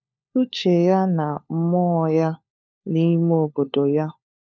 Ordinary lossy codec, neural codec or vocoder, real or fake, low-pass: none; codec, 16 kHz, 4 kbps, FunCodec, trained on LibriTTS, 50 frames a second; fake; none